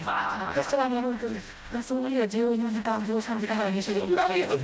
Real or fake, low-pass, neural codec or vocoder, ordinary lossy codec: fake; none; codec, 16 kHz, 0.5 kbps, FreqCodec, smaller model; none